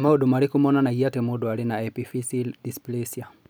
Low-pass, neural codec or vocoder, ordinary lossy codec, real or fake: none; none; none; real